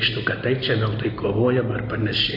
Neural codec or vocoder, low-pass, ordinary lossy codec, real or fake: none; 5.4 kHz; AAC, 32 kbps; real